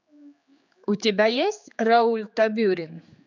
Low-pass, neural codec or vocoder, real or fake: 7.2 kHz; codec, 16 kHz, 4 kbps, X-Codec, HuBERT features, trained on general audio; fake